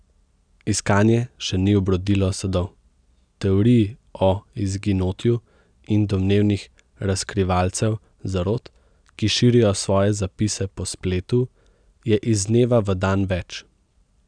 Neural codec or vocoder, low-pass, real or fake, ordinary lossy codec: none; 9.9 kHz; real; none